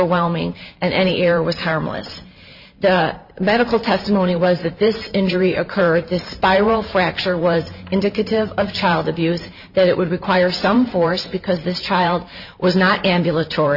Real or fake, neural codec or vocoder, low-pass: fake; vocoder, 44.1 kHz, 128 mel bands every 512 samples, BigVGAN v2; 5.4 kHz